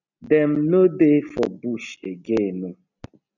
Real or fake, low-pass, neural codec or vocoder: real; 7.2 kHz; none